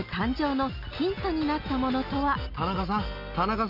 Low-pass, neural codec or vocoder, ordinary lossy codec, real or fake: 5.4 kHz; none; none; real